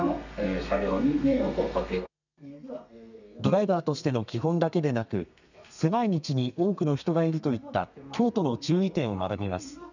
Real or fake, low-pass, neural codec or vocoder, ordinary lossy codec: fake; 7.2 kHz; codec, 32 kHz, 1.9 kbps, SNAC; none